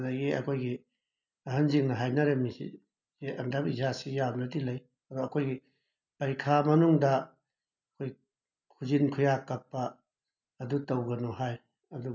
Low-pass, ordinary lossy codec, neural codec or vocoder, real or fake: 7.2 kHz; none; none; real